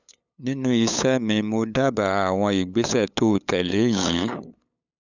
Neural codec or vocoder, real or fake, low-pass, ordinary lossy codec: codec, 16 kHz, 8 kbps, FunCodec, trained on LibriTTS, 25 frames a second; fake; 7.2 kHz; none